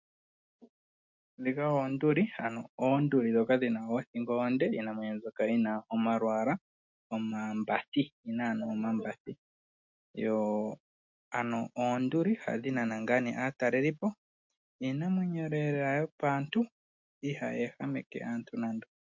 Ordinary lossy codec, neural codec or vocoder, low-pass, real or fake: MP3, 48 kbps; none; 7.2 kHz; real